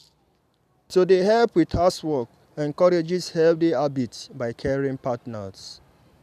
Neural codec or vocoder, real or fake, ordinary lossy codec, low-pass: none; real; none; 14.4 kHz